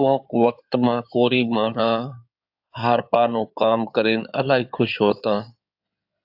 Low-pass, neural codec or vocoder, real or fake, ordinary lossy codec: 5.4 kHz; codec, 16 kHz in and 24 kHz out, 2.2 kbps, FireRedTTS-2 codec; fake; AAC, 48 kbps